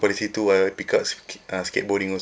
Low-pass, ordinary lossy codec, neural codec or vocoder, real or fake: none; none; none; real